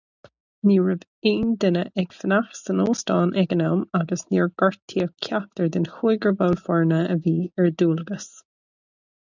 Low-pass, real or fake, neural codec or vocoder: 7.2 kHz; real; none